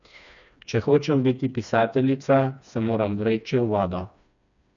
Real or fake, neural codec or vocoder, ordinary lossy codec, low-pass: fake; codec, 16 kHz, 2 kbps, FreqCodec, smaller model; none; 7.2 kHz